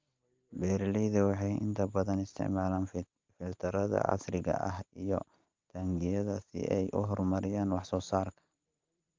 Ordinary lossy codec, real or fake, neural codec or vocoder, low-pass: Opus, 32 kbps; real; none; 7.2 kHz